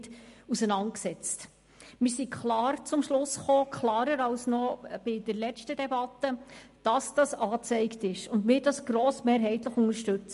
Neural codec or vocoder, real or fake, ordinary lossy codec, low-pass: none; real; MP3, 48 kbps; 14.4 kHz